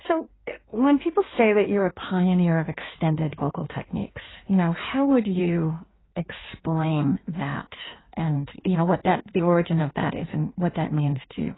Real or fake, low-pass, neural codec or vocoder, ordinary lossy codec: fake; 7.2 kHz; codec, 16 kHz in and 24 kHz out, 1.1 kbps, FireRedTTS-2 codec; AAC, 16 kbps